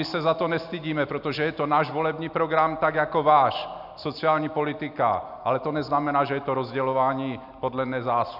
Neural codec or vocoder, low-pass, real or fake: none; 5.4 kHz; real